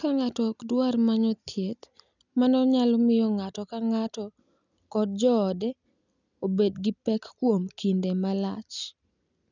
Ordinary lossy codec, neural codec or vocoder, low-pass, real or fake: none; none; 7.2 kHz; real